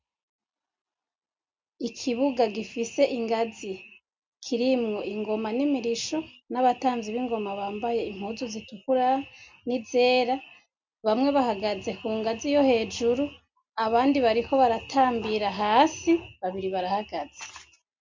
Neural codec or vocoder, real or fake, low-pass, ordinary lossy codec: none; real; 7.2 kHz; MP3, 64 kbps